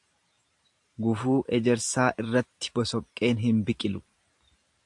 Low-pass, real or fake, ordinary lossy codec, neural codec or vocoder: 10.8 kHz; fake; AAC, 64 kbps; vocoder, 44.1 kHz, 128 mel bands every 512 samples, BigVGAN v2